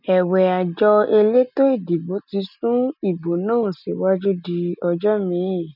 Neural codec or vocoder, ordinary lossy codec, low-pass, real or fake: none; none; 5.4 kHz; real